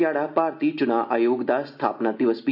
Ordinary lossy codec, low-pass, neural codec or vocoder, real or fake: none; 5.4 kHz; none; real